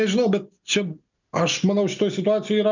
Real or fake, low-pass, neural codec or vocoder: real; 7.2 kHz; none